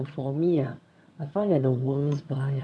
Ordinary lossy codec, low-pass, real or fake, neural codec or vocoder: none; none; fake; vocoder, 22.05 kHz, 80 mel bands, HiFi-GAN